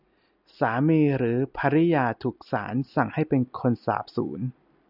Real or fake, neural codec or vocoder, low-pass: real; none; 5.4 kHz